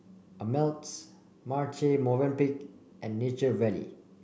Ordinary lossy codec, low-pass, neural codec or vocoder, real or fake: none; none; none; real